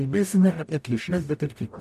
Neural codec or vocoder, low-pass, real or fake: codec, 44.1 kHz, 0.9 kbps, DAC; 14.4 kHz; fake